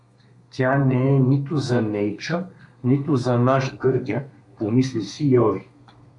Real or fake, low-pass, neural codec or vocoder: fake; 10.8 kHz; codec, 32 kHz, 1.9 kbps, SNAC